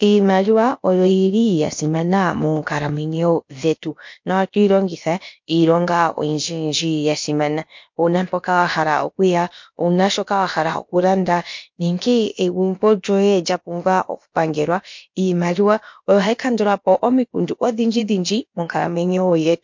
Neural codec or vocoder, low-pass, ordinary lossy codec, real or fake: codec, 16 kHz, about 1 kbps, DyCAST, with the encoder's durations; 7.2 kHz; MP3, 48 kbps; fake